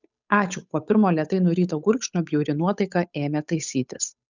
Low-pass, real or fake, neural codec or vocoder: 7.2 kHz; fake; codec, 16 kHz, 8 kbps, FunCodec, trained on Chinese and English, 25 frames a second